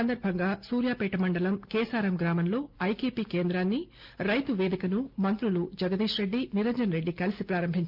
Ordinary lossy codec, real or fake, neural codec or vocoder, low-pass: Opus, 24 kbps; real; none; 5.4 kHz